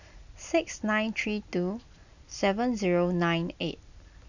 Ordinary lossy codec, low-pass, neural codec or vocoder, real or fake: none; 7.2 kHz; none; real